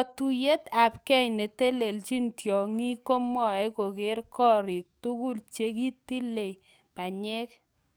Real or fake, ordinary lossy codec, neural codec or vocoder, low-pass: fake; none; codec, 44.1 kHz, 7.8 kbps, DAC; none